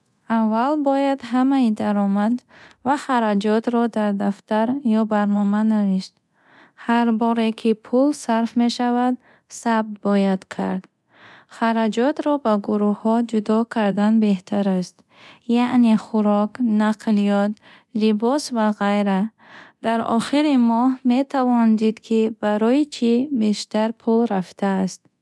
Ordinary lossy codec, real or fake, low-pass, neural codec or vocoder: none; fake; none; codec, 24 kHz, 0.9 kbps, DualCodec